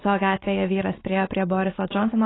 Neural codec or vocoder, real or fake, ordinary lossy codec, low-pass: none; real; AAC, 16 kbps; 7.2 kHz